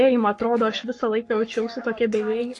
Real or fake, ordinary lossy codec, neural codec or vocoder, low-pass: fake; AAC, 48 kbps; codec, 44.1 kHz, 7.8 kbps, Pupu-Codec; 10.8 kHz